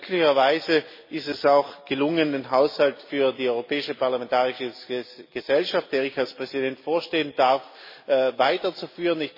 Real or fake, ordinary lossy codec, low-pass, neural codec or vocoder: real; MP3, 24 kbps; 5.4 kHz; none